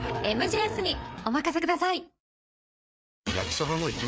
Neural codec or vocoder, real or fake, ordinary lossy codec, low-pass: codec, 16 kHz, 4 kbps, FreqCodec, larger model; fake; none; none